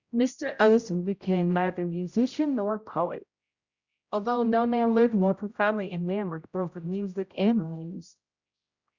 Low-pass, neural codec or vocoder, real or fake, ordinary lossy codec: 7.2 kHz; codec, 16 kHz, 0.5 kbps, X-Codec, HuBERT features, trained on general audio; fake; Opus, 64 kbps